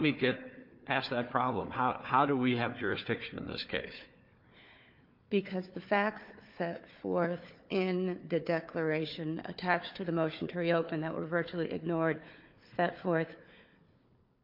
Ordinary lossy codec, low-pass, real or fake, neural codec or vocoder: MP3, 48 kbps; 5.4 kHz; fake; codec, 16 kHz, 4 kbps, FunCodec, trained on Chinese and English, 50 frames a second